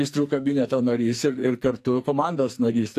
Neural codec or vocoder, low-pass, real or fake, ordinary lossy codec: codec, 44.1 kHz, 2.6 kbps, SNAC; 14.4 kHz; fake; AAC, 64 kbps